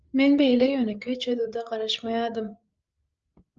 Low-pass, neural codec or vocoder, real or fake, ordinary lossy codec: 7.2 kHz; codec, 16 kHz, 8 kbps, FreqCodec, larger model; fake; Opus, 24 kbps